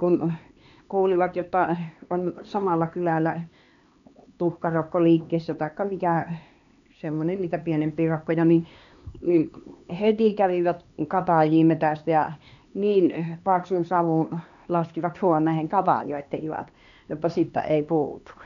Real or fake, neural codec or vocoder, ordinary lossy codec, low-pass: fake; codec, 16 kHz, 2 kbps, X-Codec, HuBERT features, trained on LibriSpeech; MP3, 96 kbps; 7.2 kHz